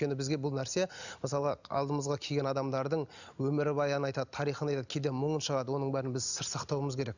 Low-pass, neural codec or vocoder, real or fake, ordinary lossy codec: 7.2 kHz; none; real; none